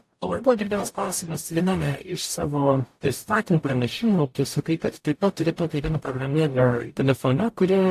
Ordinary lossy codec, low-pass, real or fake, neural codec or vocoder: AAC, 64 kbps; 14.4 kHz; fake; codec, 44.1 kHz, 0.9 kbps, DAC